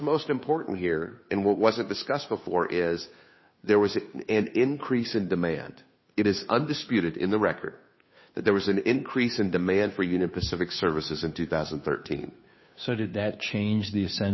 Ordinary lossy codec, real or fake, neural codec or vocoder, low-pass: MP3, 24 kbps; fake; codec, 16 kHz, 2 kbps, FunCodec, trained on Chinese and English, 25 frames a second; 7.2 kHz